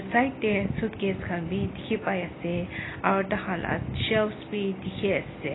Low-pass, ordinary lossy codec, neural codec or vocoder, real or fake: 7.2 kHz; AAC, 16 kbps; none; real